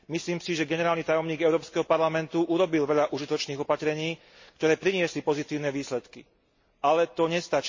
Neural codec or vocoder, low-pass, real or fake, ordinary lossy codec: none; 7.2 kHz; real; MP3, 32 kbps